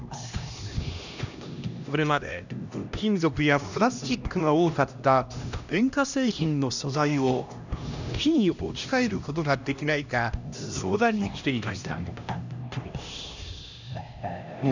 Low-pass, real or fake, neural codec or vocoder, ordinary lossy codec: 7.2 kHz; fake; codec, 16 kHz, 1 kbps, X-Codec, HuBERT features, trained on LibriSpeech; none